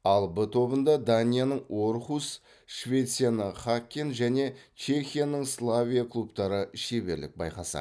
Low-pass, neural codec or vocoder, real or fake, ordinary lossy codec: none; none; real; none